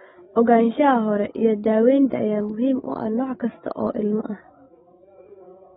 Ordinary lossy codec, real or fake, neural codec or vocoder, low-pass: AAC, 16 kbps; fake; codec, 16 kHz, 16 kbps, FreqCodec, larger model; 7.2 kHz